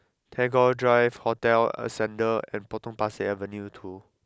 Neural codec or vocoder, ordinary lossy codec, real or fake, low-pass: none; none; real; none